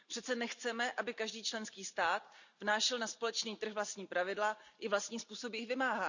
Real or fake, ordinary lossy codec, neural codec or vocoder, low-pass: real; none; none; 7.2 kHz